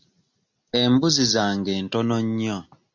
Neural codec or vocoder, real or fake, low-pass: none; real; 7.2 kHz